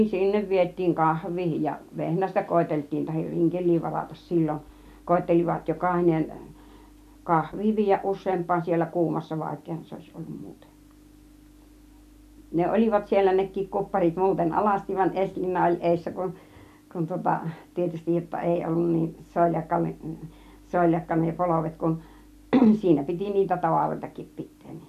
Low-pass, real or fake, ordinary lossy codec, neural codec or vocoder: 14.4 kHz; real; none; none